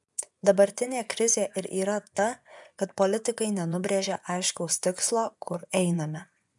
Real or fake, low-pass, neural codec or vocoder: fake; 10.8 kHz; vocoder, 24 kHz, 100 mel bands, Vocos